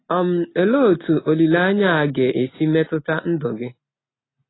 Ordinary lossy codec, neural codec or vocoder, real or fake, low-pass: AAC, 16 kbps; none; real; 7.2 kHz